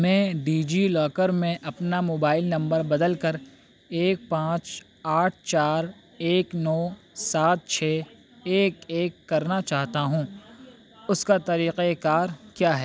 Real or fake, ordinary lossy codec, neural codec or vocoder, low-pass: real; none; none; none